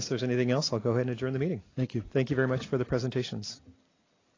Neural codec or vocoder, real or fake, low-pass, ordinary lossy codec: none; real; 7.2 kHz; AAC, 32 kbps